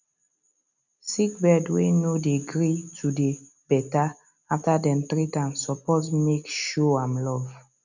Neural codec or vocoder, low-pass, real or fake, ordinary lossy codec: none; 7.2 kHz; real; none